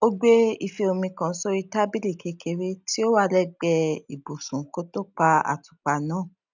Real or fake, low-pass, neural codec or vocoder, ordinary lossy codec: real; 7.2 kHz; none; none